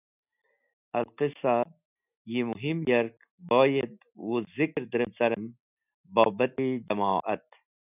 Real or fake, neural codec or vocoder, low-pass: real; none; 3.6 kHz